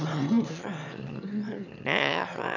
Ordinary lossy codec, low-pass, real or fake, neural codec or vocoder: none; 7.2 kHz; fake; autoencoder, 22.05 kHz, a latent of 192 numbers a frame, VITS, trained on one speaker